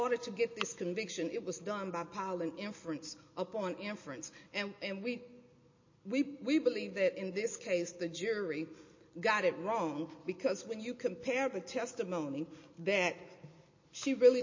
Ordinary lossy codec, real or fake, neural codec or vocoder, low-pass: MP3, 32 kbps; real; none; 7.2 kHz